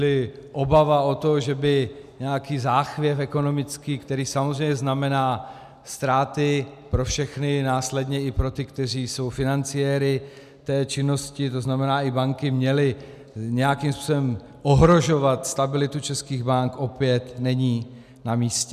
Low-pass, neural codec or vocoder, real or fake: 14.4 kHz; none; real